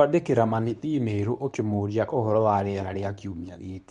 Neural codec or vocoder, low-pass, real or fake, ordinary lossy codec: codec, 24 kHz, 0.9 kbps, WavTokenizer, medium speech release version 2; 10.8 kHz; fake; MP3, 64 kbps